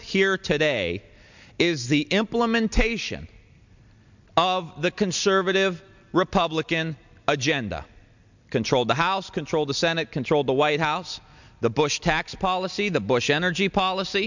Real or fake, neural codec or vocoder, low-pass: real; none; 7.2 kHz